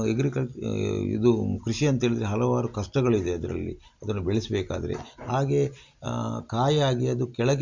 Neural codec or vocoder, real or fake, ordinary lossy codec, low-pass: none; real; MP3, 64 kbps; 7.2 kHz